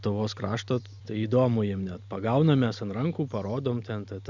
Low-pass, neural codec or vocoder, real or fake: 7.2 kHz; none; real